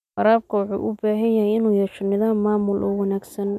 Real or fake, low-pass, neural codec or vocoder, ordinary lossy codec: real; 14.4 kHz; none; none